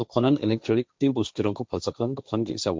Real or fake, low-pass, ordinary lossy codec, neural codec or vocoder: fake; none; none; codec, 16 kHz, 1.1 kbps, Voila-Tokenizer